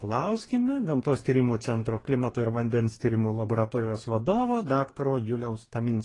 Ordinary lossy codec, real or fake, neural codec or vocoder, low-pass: AAC, 32 kbps; fake; codec, 44.1 kHz, 2.6 kbps, DAC; 10.8 kHz